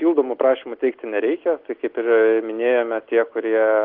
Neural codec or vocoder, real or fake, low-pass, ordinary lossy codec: none; real; 5.4 kHz; Opus, 16 kbps